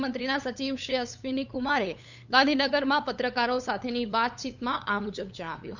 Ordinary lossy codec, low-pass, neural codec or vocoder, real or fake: none; 7.2 kHz; codec, 16 kHz, 8 kbps, FunCodec, trained on LibriTTS, 25 frames a second; fake